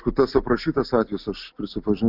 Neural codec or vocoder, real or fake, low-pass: none; real; 5.4 kHz